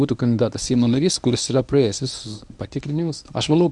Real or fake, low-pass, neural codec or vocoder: fake; 10.8 kHz; codec, 24 kHz, 0.9 kbps, WavTokenizer, medium speech release version 1